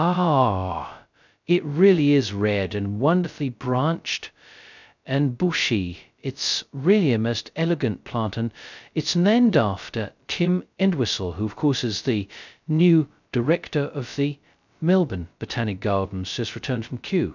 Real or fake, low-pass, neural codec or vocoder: fake; 7.2 kHz; codec, 16 kHz, 0.2 kbps, FocalCodec